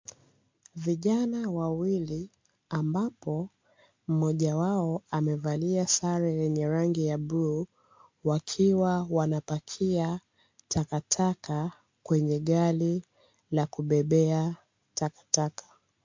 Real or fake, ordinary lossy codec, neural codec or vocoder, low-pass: real; MP3, 48 kbps; none; 7.2 kHz